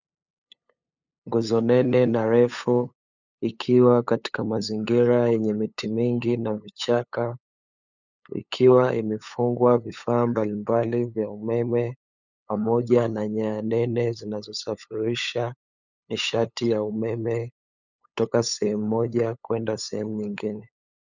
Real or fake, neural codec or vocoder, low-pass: fake; codec, 16 kHz, 8 kbps, FunCodec, trained on LibriTTS, 25 frames a second; 7.2 kHz